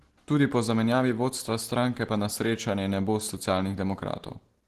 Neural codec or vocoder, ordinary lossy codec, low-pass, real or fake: none; Opus, 16 kbps; 14.4 kHz; real